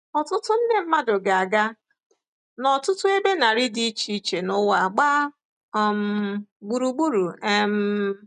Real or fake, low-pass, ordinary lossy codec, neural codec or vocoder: real; 10.8 kHz; none; none